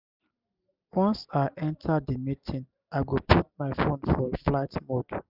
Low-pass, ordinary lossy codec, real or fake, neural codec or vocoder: 5.4 kHz; none; real; none